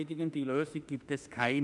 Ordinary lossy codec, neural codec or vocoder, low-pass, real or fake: none; autoencoder, 48 kHz, 32 numbers a frame, DAC-VAE, trained on Japanese speech; 10.8 kHz; fake